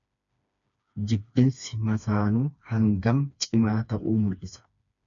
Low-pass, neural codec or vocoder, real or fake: 7.2 kHz; codec, 16 kHz, 2 kbps, FreqCodec, smaller model; fake